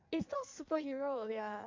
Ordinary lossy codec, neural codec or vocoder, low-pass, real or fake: MP3, 64 kbps; codec, 16 kHz in and 24 kHz out, 1.1 kbps, FireRedTTS-2 codec; 7.2 kHz; fake